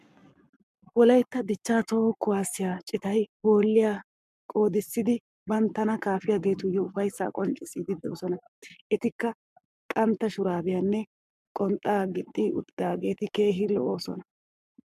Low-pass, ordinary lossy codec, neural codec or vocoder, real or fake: 14.4 kHz; MP3, 96 kbps; codec, 44.1 kHz, 7.8 kbps, Pupu-Codec; fake